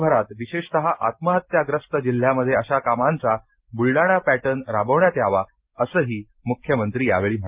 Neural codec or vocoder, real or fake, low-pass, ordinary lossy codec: none; real; 3.6 kHz; Opus, 32 kbps